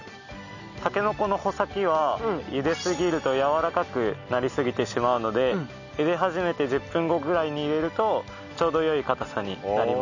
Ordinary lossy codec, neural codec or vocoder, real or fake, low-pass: none; none; real; 7.2 kHz